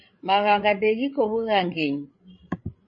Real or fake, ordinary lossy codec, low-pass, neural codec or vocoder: fake; MP3, 32 kbps; 7.2 kHz; codec, 16 kHz, 16 kbps, FreqCodec, larger model